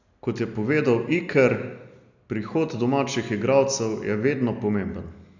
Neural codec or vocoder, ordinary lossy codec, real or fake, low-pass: none; none; real; 7.2 kHz